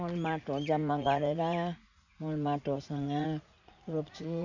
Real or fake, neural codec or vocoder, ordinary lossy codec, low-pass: fake; vocoder, 44.1 kHz, 80 mel bands, Vocos; none; 7.2 kHz